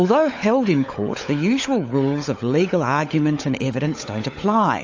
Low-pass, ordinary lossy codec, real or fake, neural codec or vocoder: 7.2 kHz; AAC, 48 kbps; fake; codec, 16 kHz, 16 kbps, FunCodec, trained on LibriTTS, 50 frames a second